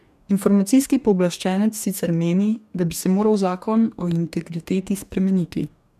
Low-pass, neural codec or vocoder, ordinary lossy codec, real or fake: 14.4 kHz; codec, 44.1 kHz, 2.6 kbps, DAC; none; fake